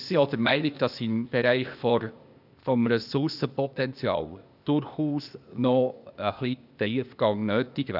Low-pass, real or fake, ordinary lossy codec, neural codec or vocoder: 5.4 kHz; fake; none; codec, 16 kHz, 0.8 kbps, ZipCodec